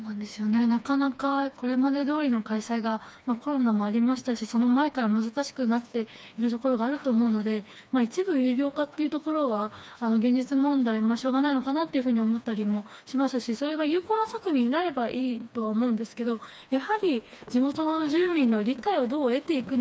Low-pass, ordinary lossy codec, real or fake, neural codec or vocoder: none; none; fake; codec, 16 kHz, 2 kbps, FreqCodec, smaller model